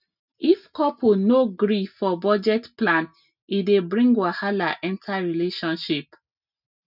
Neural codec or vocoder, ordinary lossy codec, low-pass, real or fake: none; none; 5.4 kHz; real